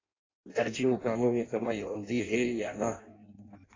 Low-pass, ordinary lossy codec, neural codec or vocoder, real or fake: 7.2 kHz; AAC, 32 kbps; codec, 16 kHz in and 24 kHz out, 0.6 kbps, FireRedTTS-2 codec; fake